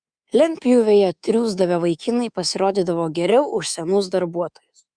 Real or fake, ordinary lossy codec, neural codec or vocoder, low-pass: fake; Opus, 64 kbps; codec, 24 kHz, 3.1 kbps, DualCodec; 9.9 kHz